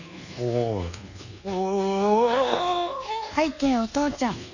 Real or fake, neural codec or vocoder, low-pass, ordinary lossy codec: fake; codec, 24 kHz, 1.2 kbps, DualCodec; 7.2 kHz; none